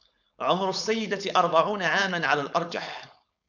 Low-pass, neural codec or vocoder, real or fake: 7.2 kHz; codec, 16 kHz, 4.8 kbps, FACodec; fake